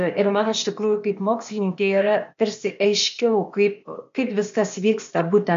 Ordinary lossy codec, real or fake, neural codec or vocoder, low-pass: MP3, 48 kbps; fake; codec, 16 kHz, 0.8 kbps, ZipCodec; 7.2 kHz